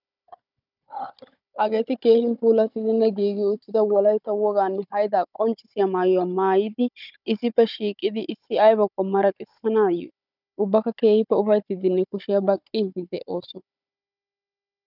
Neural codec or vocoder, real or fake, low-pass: codec, 16 kHz, 16 kbps, FunCodec, trained on Chinese and English, 50 frames a second; fake; 5.4 kHz